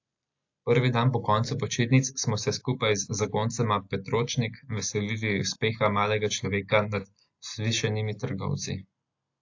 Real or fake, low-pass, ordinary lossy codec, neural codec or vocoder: real; 7.2 kHz; AAC, 48 kbps; none